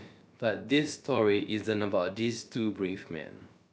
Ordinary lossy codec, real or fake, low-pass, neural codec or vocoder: none; fake; none; codec, 16 kHz, about 1 kbps, DyCAST, with the encoder's durations